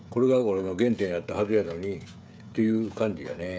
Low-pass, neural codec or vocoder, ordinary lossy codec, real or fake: none; codec, 16 kHz, 16 kbps, FreqCodec, smaller model; none; fake